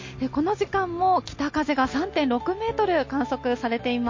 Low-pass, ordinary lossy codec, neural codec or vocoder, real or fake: 7.2 kHz; MP3, 32 kbps; none; real